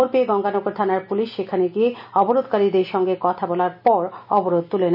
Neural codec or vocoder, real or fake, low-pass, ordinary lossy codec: none; real; 5.4 kHz; none